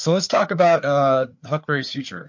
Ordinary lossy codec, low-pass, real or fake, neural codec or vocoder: MP3, 48 kbps; 7.2 kHz; fake; codec, 16 kHz, 4 kbps, FreqCodec, larger model